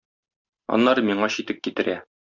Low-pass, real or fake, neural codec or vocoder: 7.2 kHz; real; none